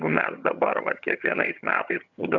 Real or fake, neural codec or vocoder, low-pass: fake; vocoder, 22.05 kHz, 80 mel bands, HiFi-GAN; 7.2 kHz